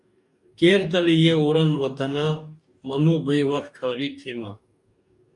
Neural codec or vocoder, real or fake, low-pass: codec, 44.1 kHz, 2.6 kbps, DAC; fake; 10.8 kHz